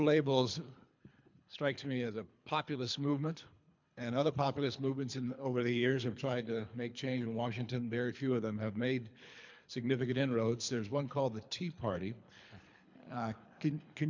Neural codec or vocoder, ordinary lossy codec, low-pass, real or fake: codec, 24 kHz, 3 kbps, HILCodec; MP3, 64 kbps; 7.2 kHz; fake